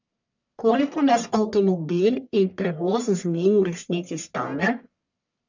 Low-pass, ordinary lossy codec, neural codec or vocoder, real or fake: 7.2 kHz; none; codec, 44.1 kHz, 1.7 kbps, Pupu-Codec; fake